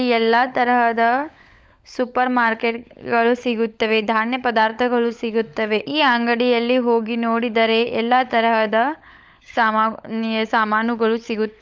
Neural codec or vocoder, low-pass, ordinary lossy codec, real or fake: codec, 16 kHz, 16 kbps, FunCodec, trained on LibriTTS, 50 frames a second; none; none; fake